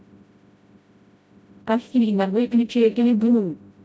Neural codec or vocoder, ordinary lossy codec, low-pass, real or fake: codec, 16 kHz, 0.5 kbps, FreqCodec, smaller model; none; none; fake